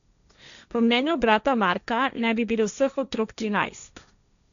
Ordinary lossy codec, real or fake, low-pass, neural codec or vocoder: none; fake; 7.2 kHz; codec, 16 kHz, 1.1 kbps, Voila-Tokenizer